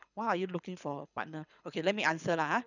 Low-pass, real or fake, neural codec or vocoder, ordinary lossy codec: 7.2 kHz; fake; codec, 24 kHz, 6 kbps, HILCodec; none